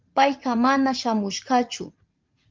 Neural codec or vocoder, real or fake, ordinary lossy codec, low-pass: vocoder, 44.1 kHz, 80 mel bands, Vocos; fake; Opus, 32 kbps; 7.2 kHz